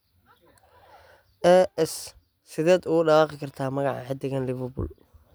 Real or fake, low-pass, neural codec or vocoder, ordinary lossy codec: real; none; none; none